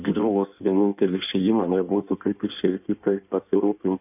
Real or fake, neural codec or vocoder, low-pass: fake; codec, 16 kHz in and 24 kHz out, 1.1 kbps, FireRedTTS-2 codec; 3.6 kHz